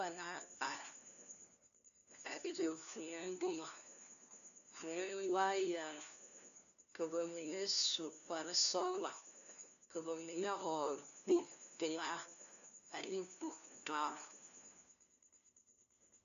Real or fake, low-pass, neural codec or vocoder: fake; 7.2 kHz; codec, 16 kHz, 1 kbps, FunCodec, trained on LibriTTS, 50 frames a second